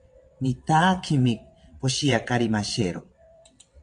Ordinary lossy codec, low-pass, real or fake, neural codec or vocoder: MP3, 64 kbps; 9.9 kHz; fake; vocoder, 22.05 kHz, 80 mel bands, WaveNeXt